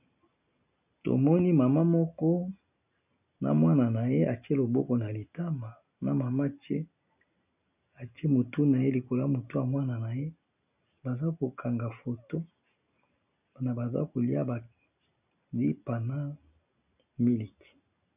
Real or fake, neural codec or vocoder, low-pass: real; none; 3.6 kHz